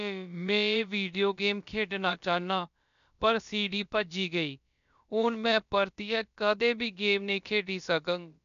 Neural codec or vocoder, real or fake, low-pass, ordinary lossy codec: codec, 16 kHz, about 1 kbps, DyCAST, with the encoder's durations; fake; 7.2 kHz; none